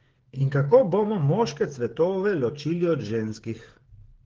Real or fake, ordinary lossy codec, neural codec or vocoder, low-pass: fake; Opus, 16 kbps; codec, 16 kHz, 8 kbps, FreqCodec, smaller model; 7.2 kHz